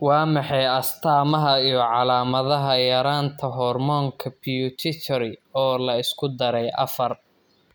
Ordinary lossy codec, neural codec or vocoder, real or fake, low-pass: none; none; real; none